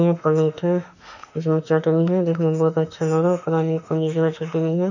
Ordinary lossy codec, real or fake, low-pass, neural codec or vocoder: none; fake; 7.2 kHz; autoencoder, 48 kHz, 32 numbers a frame, DAC-VAE, trained on Japanese speech